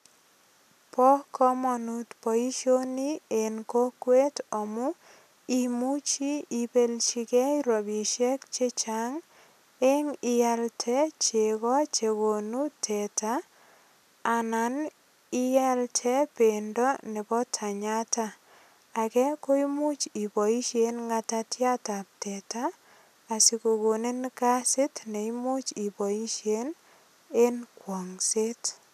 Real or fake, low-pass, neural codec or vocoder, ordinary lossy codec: real; 14.4 kHz; none; none